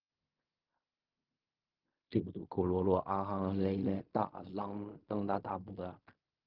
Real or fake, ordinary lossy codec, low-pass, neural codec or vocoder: fake; Opus, 24 kbps; 5.4 kHz; codec, 16 kHz in and 24 kHz out, 0.4 kbps, LongCat-Audio-Codec, fine tuned four codebook decoder